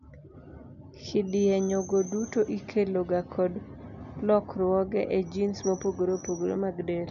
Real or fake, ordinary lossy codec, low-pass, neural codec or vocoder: real; none; 7.2 kHz; none